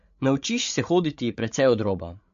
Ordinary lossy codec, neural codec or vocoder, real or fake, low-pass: MP3, 48 kbps; codec, 16 kHz, 16 kbps, FreqCodec, larger model; fake; 7.2 kHz